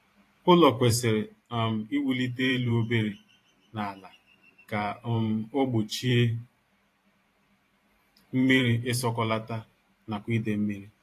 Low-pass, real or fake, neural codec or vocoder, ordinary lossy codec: 14.4 kHz; fake; vocoder, 44.1 kHz, 128 mel bands every 512 samples, BigVGAN v2; AAC, 48 kbps